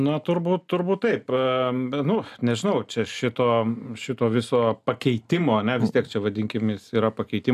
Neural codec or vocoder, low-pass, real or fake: none; 14.4 kHz; real